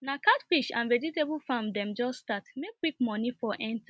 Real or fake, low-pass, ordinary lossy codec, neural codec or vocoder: real; none; none; none